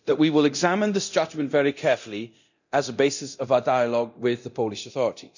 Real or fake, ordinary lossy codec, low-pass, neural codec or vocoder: fake; none; 7.2 kHz; codec, 24 kHz, 0.9 kbps, DualCodec